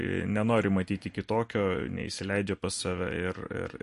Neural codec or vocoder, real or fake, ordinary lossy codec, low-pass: vocoder, 44.1 kHz, 128 mel bands every 256 samples, BigVGAN v2; fake; MP3, 48 kbps; 14.4 kHz